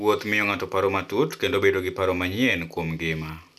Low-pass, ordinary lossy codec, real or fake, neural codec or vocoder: 14.4 kHz; none; real; none